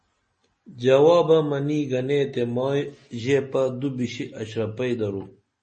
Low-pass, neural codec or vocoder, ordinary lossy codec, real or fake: 10.8 kHz; none; MP3, 32 kbps; real